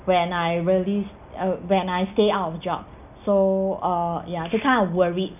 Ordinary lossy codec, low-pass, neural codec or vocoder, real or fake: none; 3.6 kHz; none; real